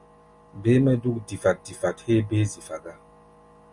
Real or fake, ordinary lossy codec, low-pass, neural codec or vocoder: real; Opus, 64 kbps; 10.8 kHz; none